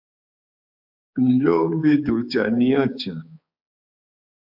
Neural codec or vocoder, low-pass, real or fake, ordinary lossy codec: codec, 16 kHz, 4 kbps, X-Codec, HuBERT features, trained on balanced general audio; 5.4 kHz; fake; AAC, 48 kbps